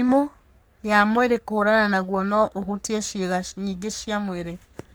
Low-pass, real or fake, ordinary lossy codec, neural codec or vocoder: none; fake; none; codec, 44.1 kHz, 3.4 kbps, Pupu-Codec